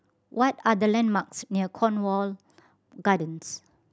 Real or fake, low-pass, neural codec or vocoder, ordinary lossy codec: real; none; none; none